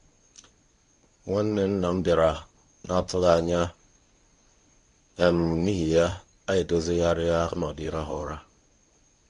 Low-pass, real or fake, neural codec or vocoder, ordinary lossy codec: 10.8 kHz; fake; codec, 24 kHz, 0.9 kbps, WavTokenizer, medium speech release version 1; AAC, 48 kbps